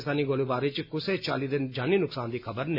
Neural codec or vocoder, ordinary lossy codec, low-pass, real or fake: none; none; 5.4 kHz; real